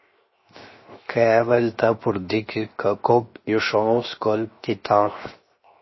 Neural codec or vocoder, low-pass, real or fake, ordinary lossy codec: codec, 16 kHz, 0.7 kbps, FocalCodec; 7.2 kHz; fake; MP3, 24 kbps